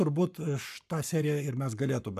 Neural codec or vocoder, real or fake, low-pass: codec, 44.1 kHz, 7.8 kbps, Pupu-Codec; fake; 14.4 kHz